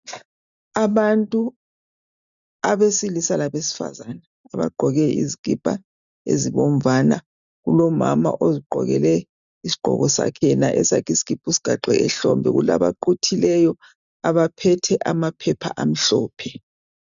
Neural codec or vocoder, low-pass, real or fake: none; 7.2 kHz; real